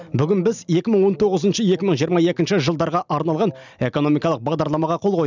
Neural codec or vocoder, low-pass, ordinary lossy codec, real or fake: none; 7.2 kHz; none; real